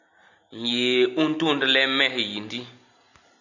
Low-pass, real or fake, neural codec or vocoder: 7.2 kHz; real; none